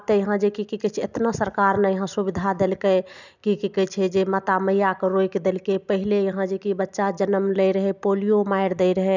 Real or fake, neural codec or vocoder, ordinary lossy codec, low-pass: real; none; none; 7.2 kHz